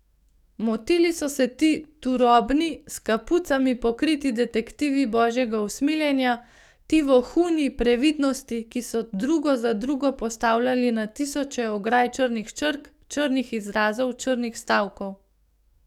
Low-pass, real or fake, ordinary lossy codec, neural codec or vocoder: 19.8 kHz; fake; none; codec, 44.1 kHz, 7.8 kbps, DAC